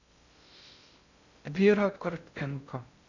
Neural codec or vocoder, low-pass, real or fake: codec, 16 kHz in and 24 kHz out, 0.6 kbps, FocalCodec, streaming, 2048 codes; 7.2 kHz; fake